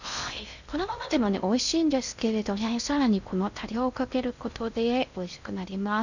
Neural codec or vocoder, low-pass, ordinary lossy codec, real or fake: codec, 16 kHz in and 24 kHz out, 0.6 kbps, FocalCodec, streaming, 2048 codes; 7.2 kHz; none; fake